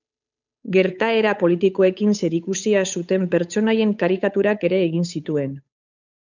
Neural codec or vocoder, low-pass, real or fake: codec, 16 kHz, 8 kbps, FunCodec, trained on Chinese and English, 25 frames a second; 7.2 kHz; fake